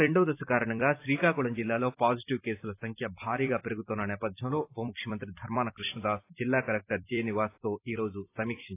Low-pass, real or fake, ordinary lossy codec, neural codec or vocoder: 3.6 kHz; fake; AAC, 24 kbps; vocoder, 44.1 kHz, 128 mel bands every 256 samples, BigVGAN v2